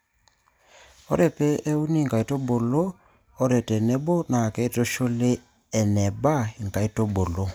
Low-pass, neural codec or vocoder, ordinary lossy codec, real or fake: none; none; none; real